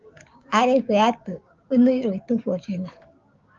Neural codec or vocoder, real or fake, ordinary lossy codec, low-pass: codec, 16 kHz, 6 kbps, DAC; fake; Opus, 32 kbps; 7.2 kHz